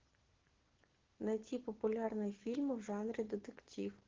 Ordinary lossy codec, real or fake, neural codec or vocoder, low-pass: Opus, 16 kbps; real; none; 7.2 kHz